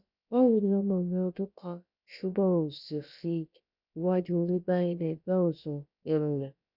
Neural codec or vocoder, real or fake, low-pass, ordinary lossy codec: codec, 16 kHz, about 1 kbps, DyCAST, with the encoder's durations; fake; 5.4 kHz; none